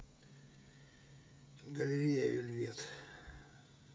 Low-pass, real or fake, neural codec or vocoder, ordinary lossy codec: none; fake; codec, 16 kHz, 16 kbps, FreqCodec, smaller model; none